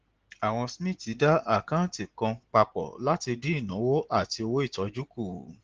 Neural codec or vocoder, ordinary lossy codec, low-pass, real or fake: none; Opus, 16 kbps; 7.2 kHz; real